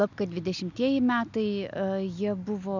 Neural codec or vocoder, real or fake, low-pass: none; real; 7.2 kHz